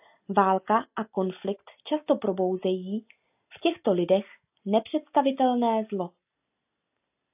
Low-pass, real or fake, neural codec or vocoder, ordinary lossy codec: 3.6 kHz; real; none; AAC, 32 kbps